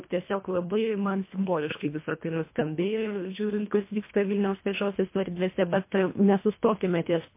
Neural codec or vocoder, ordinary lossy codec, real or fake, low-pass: codec, 24 kHz, 1.5 kbps, HILCodec; MP3, 24 kbps; fake; 3.6 kHz